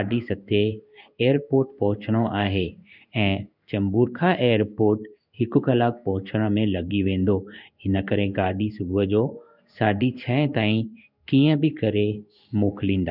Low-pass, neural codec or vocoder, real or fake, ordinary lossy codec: 5.4 kHz; codec, 16 kHz in and 24 kHz out, 1 kbps, XY-Tokenizer; fake; none